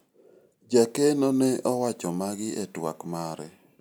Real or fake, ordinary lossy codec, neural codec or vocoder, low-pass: real; none; none; none